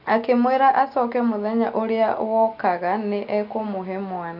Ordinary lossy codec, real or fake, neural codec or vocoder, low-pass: none; real; none; 5.4 kHz